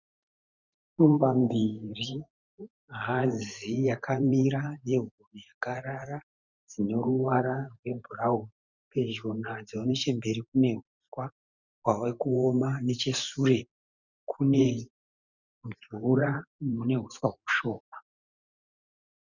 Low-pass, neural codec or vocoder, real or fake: 7.2 kHz; vocoder, 44.1 kHz, 128 mel bands every 512 samples, BigVGAN v2; fake